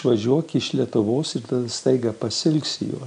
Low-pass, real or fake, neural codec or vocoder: 9.9 kHz; real; none